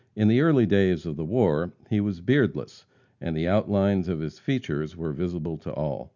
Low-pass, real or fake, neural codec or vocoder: 7.2 kHz; real; none